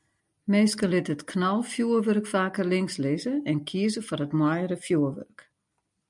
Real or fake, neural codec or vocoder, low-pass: real; none; 10.8 kHz